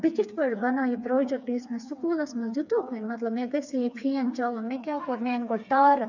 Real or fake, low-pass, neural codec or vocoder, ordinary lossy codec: fake; 7.2 kHz; codec, 16 kHz, 4 kbps, FreqCodec, smaller model; none